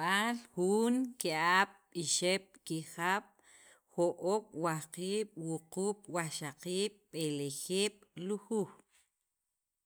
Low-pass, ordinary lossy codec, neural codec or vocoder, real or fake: none; none; none; real